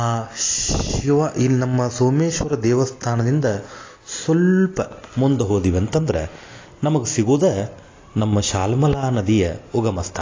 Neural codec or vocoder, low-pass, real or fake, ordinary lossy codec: none; 7.2 kHz; real; AAC, 32 kbps